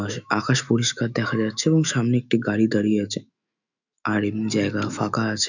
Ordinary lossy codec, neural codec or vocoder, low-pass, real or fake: none; autoencoder, 48 kHz, 128 numbers a frame, DAC-VAE, trained on Japanese speech; 7.2 kHz; fake